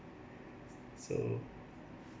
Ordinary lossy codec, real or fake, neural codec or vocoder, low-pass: none; real; none; none